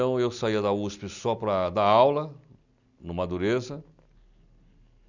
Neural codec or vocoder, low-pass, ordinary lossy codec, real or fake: none; 7.2 kHz; none; real